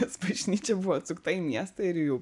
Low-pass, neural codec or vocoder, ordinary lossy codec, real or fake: 10.8 kHz; none; MP3, 96 kbps; real